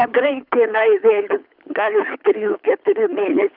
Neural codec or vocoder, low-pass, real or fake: codec, 24 kHz, 6 kbps, HILCodec; 5.4 kHz; fake